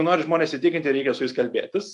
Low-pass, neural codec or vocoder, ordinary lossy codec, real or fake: 10.8 kHz; none; AAC, 64 kbps; real